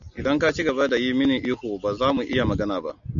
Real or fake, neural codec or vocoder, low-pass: real; none; 7.2 kHz